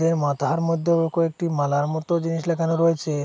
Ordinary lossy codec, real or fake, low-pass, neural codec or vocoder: none; real; none; none